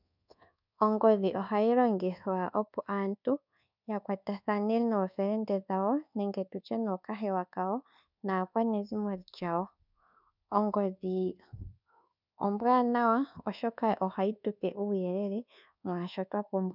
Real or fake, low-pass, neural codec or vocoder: fake; 5.4 kHz; codec, 24 kHz, 1.2 kbps, DualCodec